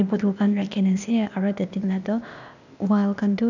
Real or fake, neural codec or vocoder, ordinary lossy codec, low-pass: fake; codec, 16 kHz, 0.8 kbps, ZipCodec; none; 7.2 kHz